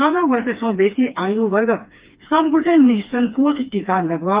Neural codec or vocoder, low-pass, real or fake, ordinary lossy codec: codec, 16 kHz, 2 kbps, FreqCodec, smaller model; 3.6 kHz; fake; Opus, 32 kbps